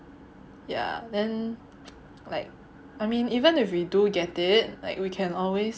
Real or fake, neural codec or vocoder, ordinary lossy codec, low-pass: real; none; none; none